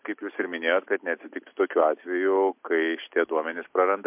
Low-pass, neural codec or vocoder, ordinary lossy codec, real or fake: 3.6 kHz; none; MP3, 32 kbps; real